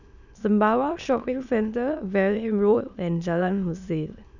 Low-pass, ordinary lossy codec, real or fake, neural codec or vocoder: 7.2 kHz; none; fake; autoencoder, 22.05 kHz, a latent of 192 numbers a frame, VITS, trained on many speakers